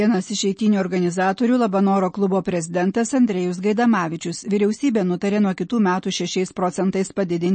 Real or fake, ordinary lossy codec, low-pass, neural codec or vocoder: real; MP3, 32 kbps; 9.9 kHz; none